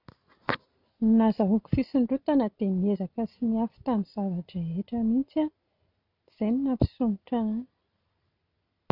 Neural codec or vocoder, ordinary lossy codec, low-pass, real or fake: none; none; 5.4 kHz; real